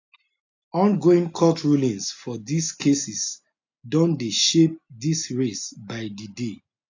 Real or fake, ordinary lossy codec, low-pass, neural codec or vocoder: real; AAC, 48 kbps; 7.2 kHz; none